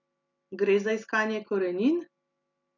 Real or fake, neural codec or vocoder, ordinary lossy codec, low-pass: real; none; none; 7.2 kHz